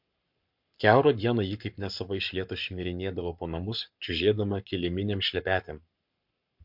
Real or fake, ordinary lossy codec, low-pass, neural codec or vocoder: fake; MP3, 48 kbps; 5.4 kHz; codec, 44.1 kHz, 7.8 kbps, Pupu-Codec